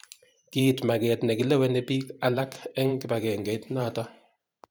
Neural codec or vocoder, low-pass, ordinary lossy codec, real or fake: vocoder, 44.1 kHz, 128 mel bands every 512 samples, BigVGAN v2; none; none; fake